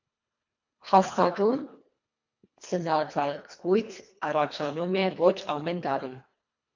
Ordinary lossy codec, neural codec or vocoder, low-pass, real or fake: MP3, 48 kbps; codec, 24 kHz, 1.5 kbps, HILCodec; 7.2 kHz; fake